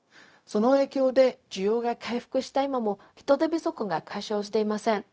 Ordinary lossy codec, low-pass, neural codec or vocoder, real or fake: none; none; codec, 16 kHz, 0.4 kbps, LongCat-Audio-Codec; fake